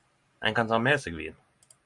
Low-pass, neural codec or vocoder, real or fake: 10.8 kHz; none; real